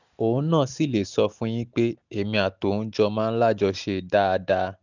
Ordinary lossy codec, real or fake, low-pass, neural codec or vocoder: none; real; 7.2 kHz; none